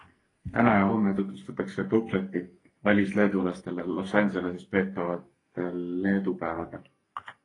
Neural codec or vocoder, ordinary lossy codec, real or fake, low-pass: codec, 44.1 kHz, 2.6 kbps, SNAC; AAC, 32 kbps; fake; 10.8 kHz